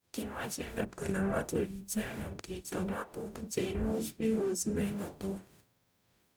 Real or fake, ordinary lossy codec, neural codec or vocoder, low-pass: fake; none; codec, 44.1 kHz, 0.9 kbps, DAC; none